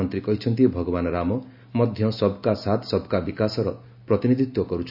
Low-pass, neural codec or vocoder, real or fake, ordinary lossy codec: 5.4 kHz; none; real; none